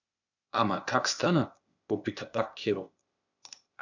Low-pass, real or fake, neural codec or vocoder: 7.2 kHz; fake; codec, 16 kHz, 0.8 kbps, ZipCodec